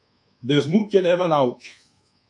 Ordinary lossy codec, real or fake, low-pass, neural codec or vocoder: MP3, 96 kbps; fake; 10.8 kHz; codec, 24 kHz, 1.2 kbps, DualCodec